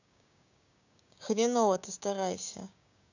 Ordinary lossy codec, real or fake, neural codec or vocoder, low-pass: none; fake; codec, 16 kHz, 6 kbps, DAC; 7.2 kHz